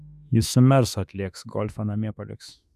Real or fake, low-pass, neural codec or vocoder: fake; 14.4 kHz; autoencoder, 48 kHz, 32 numbers a frame, DAC-VAE, trained on Japanese speech